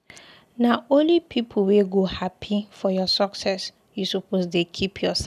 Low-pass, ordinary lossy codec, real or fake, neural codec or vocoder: 14.4 kHz; none; real; none